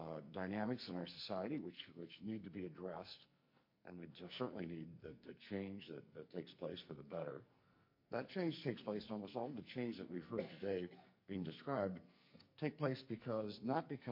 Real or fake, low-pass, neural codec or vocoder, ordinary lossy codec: fake; 5.4 kHz; codec, 44.1 kHz, 2.6 kbps, SNAC; MP3, 32 kbps